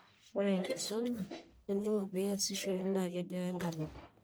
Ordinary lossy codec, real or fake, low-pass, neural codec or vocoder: none; fake; none; codec, 44.1 kHz, 1.7 kbps, Pupu-Codec